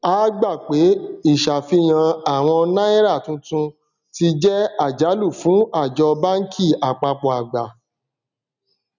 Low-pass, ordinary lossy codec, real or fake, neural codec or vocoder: 7.2 kHz; none; real; none